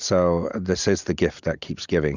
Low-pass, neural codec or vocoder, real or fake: 7.2 kHz; none; real